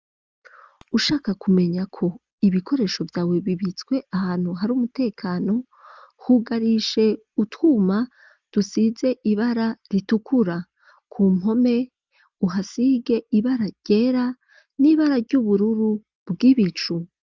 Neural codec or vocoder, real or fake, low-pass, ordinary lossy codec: none; real; 7.2 kHz; Opus, 24 kbps